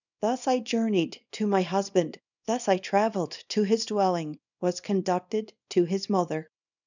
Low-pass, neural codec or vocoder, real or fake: 7.2 kHz; codec, 24 kHz, 0.9 kbps, WavTokenizer, small release; fake